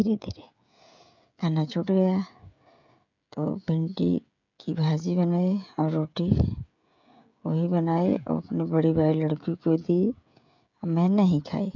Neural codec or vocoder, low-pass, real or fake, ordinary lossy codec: codec, 16 kHz, 16 kbps, FreqCodec, smaller model; 7.2 kHz; fake; none